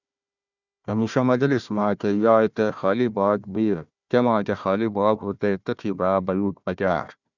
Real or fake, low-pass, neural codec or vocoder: fake; 7.2 kHz; codec, 16 kHz, 1 kbps, FunCodec, trained on Chinese and English, 50 frames a second